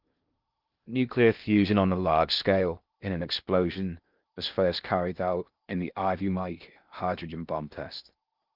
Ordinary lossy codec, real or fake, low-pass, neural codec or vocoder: Opus, 32 kbps; fake; 5.4 kHz; codec, 16 kHz in and 24 kHz out, 0.6 kbps, FocalCodec, streaming, 2048 codes